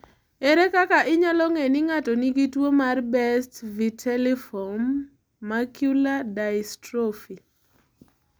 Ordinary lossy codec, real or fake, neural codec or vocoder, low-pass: none; real; none; none